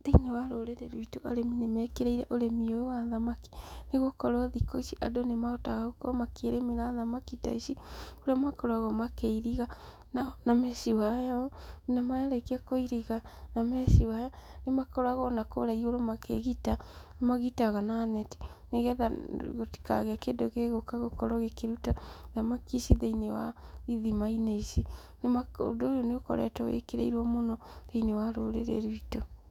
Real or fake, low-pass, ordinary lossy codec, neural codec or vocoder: fake; 19.8 kHz; none; autoencoder, 48 kHz, 128 numbers a frame, DAC-VAE, trained on Japanese speech